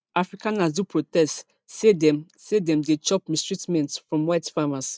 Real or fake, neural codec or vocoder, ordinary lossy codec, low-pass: real; none; none; none